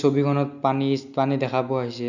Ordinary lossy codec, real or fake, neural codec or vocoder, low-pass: none; real; none; 7.2 kHz